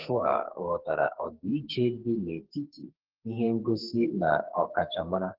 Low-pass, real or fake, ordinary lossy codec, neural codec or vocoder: 5.4 kHz; fake; Opus, 16 kbps; codec, 44.1 kHz, 2.6 kbps, SNAC